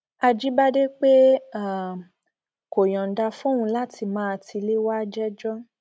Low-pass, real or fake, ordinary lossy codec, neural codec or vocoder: none; real; none; none